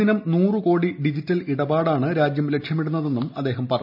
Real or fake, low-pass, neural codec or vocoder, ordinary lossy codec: real; 5.4 kHz; none; none